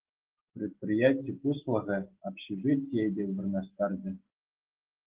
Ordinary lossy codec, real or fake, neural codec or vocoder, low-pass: Opus, 16 kbps; real; none; 3.6 kHz